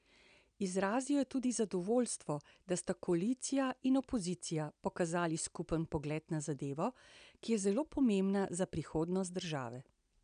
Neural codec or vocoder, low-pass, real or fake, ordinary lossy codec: none; 9.9 kHz; real; none